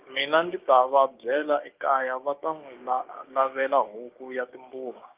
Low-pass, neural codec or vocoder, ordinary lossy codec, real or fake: 3.6 kHz; codec, 16 kHz, 6 kbps, DAC; Opus, 24 kbps; fake